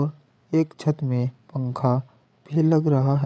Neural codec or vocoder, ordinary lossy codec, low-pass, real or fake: codec, 16 kHz, 8 kbps, FreqCodec, larger model; none; none; fake